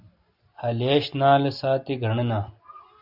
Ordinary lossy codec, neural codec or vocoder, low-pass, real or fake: AAC, 48 kbps; none; 5.4 kHz; real